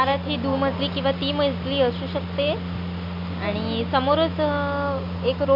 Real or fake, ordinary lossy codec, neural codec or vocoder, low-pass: real; AAC, 48 kbps; none; 5.4 kHz